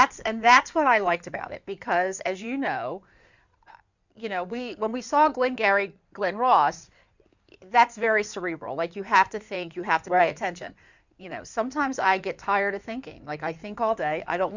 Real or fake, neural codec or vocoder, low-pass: fake; codec, 16 kHz in and 24 kHz out, 2.2 kbps, FireRedTTS-2 codec; 7.2 kHz